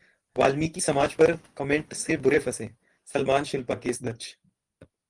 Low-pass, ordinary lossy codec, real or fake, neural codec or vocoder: 10.8 kHz; Opus, 24 kbps; fake; vocoder, 24 kHz, 100 mel bands, Vocos